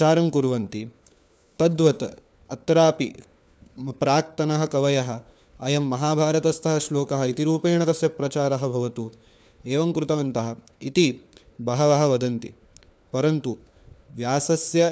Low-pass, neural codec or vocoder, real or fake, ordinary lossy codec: none; codec, 16 kHz, 4 kbps, FunCodec, trained on LibriTTS, 50 frames a second; fake; none